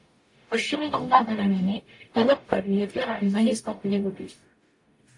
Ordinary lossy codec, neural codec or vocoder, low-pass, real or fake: AAC, 48 kbps; codec, 44.1 kHz, 0.9 kbps, DAC; 10.8 kHz; fake